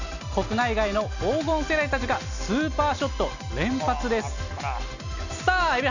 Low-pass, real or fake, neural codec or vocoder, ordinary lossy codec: 7.2 kHz; real; none; none